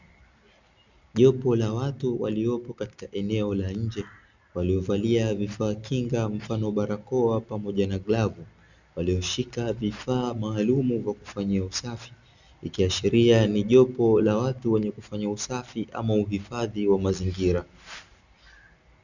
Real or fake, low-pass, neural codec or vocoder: real; 7.2 kHz; none